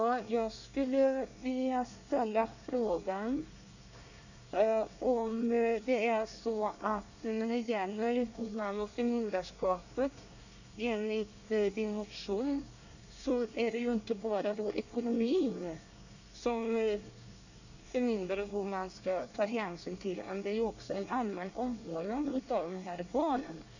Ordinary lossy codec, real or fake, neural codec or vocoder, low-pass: none; fake; codec, 24 kHz, 1 kbps, SNAC; 7.2 kHz